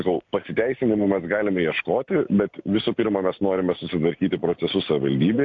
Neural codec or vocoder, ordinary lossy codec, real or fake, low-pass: none; AAC, 48 kbps; real; 7.2 kHz